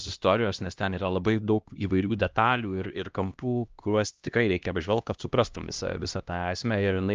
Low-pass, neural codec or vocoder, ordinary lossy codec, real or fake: 7.2 kHz; codec, 16 kHz, 1 kbps, X-Codec, HuBERT features, trained on LibriSpeech; Opus, 24 kbps; fake